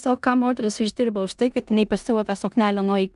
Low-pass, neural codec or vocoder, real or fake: 10.8 kHz; codec, 16 kHz in and 24 kHz out, 0.9 kbps, LongCat-Audio-Codec, four codebook decoder; fake